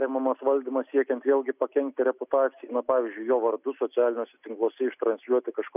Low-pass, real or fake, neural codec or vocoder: 3.6 kHz; real; none